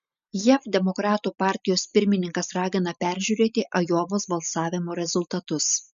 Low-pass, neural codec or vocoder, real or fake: 7.2 kHz; none; real